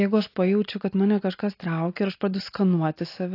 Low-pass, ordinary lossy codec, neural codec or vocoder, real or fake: 5.4 kHz; AAC, 32 kbps; none; real